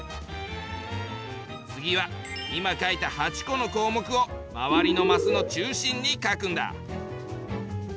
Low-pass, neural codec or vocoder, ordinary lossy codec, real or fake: none; none; none; real